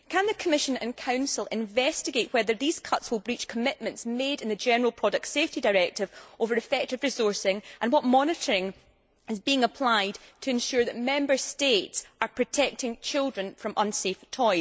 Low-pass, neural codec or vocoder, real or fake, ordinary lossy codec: none; none; real; none